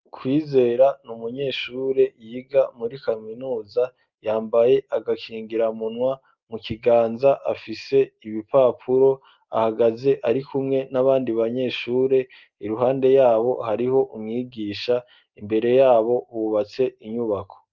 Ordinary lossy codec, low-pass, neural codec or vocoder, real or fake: Opus, 32 kbps; 7.2 kHz; none; real